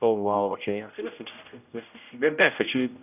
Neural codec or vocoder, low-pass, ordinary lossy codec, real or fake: codec, 16 kHz, 0.5 kbps, X-Codec, HuBERT features, trained on general audio; 3.6 kHz; none; fake